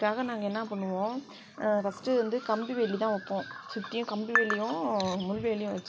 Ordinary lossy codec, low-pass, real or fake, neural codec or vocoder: none; none; real; none